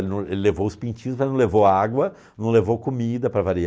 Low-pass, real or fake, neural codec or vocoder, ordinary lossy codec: none; real; none; none